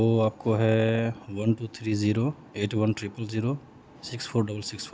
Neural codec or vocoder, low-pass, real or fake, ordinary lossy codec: none; none; real; none